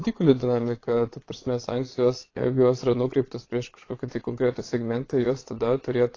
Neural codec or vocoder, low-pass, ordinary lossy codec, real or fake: vocoder, 22.05 kHz, 80 mel bands, Vocos; 7.2 kHz; AAC, 32 kbps; fake